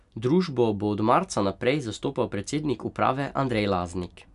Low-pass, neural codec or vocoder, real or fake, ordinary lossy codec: 10.8 kHz; none; real; none